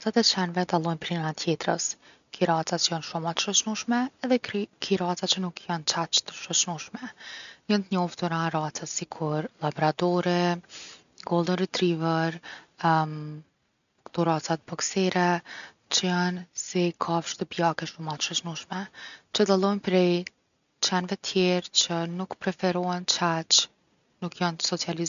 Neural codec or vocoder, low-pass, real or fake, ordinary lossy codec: none; 7.2 kHz; real; none